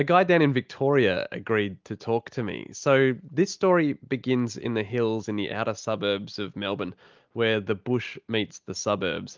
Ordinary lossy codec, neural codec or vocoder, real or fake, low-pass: Opus, 24 kbps; none; real; 7.2 kHz